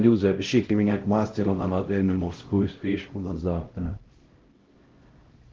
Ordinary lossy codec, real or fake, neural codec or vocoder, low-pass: Opus, 16 kbps; fake; codec, 16 kHz, 0.5 kbps, X-Codec, HuBERT features, trained on LibriSpeech; 7.2 kHz